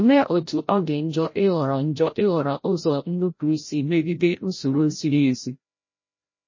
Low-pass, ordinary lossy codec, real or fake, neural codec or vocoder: 7.2 kHz; MP3, 32 kbps; fake; codec, 16 kHz, 0.5 kbps, FreqCodec, larger model